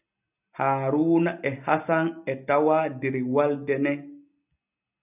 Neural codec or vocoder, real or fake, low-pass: none; real; 3.6 kHz